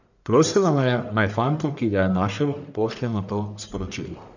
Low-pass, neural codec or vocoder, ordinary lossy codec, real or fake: 7.2 kHz; codec, 44.1 kHz, 1.7 kbps, Pupu-Codec; none; fake